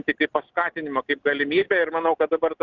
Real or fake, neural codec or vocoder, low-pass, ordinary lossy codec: real; none; 7.2 kHz; Opus, 24 kbps